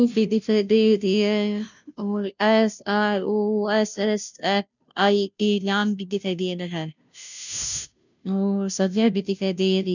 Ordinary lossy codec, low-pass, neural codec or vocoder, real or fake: none; 7.2 kHz; codec, 16 kHz, 0.5 kbps, FunCodec, trained on Chinese and English, 25 frames a second; fake